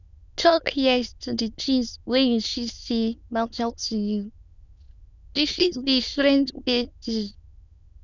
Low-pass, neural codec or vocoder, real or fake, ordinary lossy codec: 7.2 kHz; autoencoder, 22.05 kHz, a latent of 192 numbers a frame, VITS, trained on many speakers; fake; none